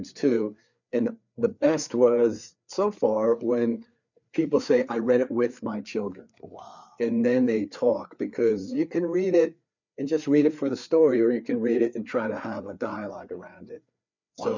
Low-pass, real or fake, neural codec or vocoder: 7.2 kHz; fake; codec, 16 kHz, 4 kbps, FreqCodec, larger model